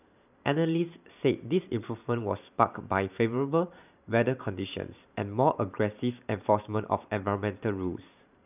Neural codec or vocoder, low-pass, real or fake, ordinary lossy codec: vocoder, 44.1 kHz, 128 mel bands every 512 samples, BigVGAN v2; 3.6 kHz; fake; none